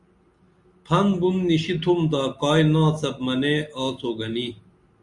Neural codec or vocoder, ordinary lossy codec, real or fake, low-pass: none; Opus, 64 kbps; real; 10.8 kHz